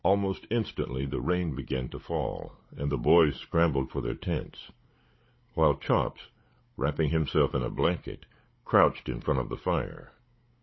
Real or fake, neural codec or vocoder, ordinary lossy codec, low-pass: fake; codec, 16 kHz, 16 kbps, FunCodec, trained on Chinese and English, 50 frames a second; MP3, 24 kbps; 7.2 kHz